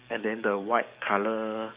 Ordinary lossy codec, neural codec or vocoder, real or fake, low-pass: Opus, 64 kbps; codec, 44.1 kHz, 7.8 kbps, DAC; fake; 3.6 kHz